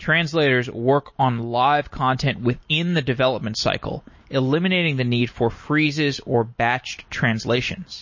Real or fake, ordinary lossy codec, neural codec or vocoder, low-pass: real; MP3, 32 kbps; none; 7.2 kHz